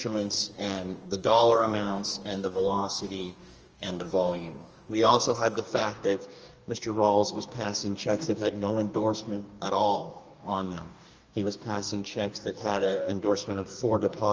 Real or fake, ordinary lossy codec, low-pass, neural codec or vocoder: fake; Opus, 24 kbps; 7.2 kHz; codec, 44.1 kHz, 2.6 kbps, DAC